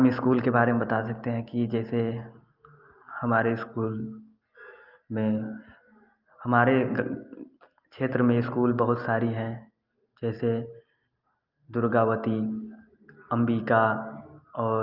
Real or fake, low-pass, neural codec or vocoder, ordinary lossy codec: real; 5.4 kHz; none; Opus, 24 kbps